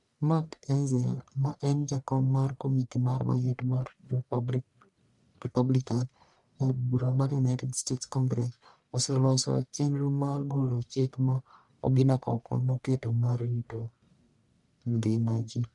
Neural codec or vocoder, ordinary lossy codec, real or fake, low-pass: codec, 44.1 kHz, 1.7 kbps, Pupu-Codec; none; fake; 10.8 kHz